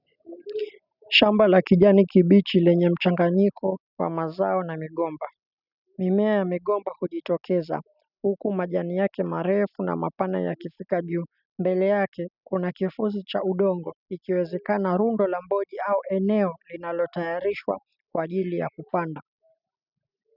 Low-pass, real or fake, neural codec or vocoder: 5.4 kHz; real; none